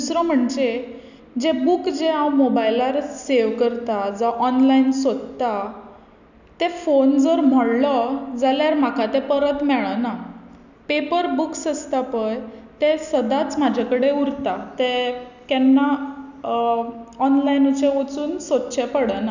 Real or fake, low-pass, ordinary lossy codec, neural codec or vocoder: real; 7.2 kHz; none; none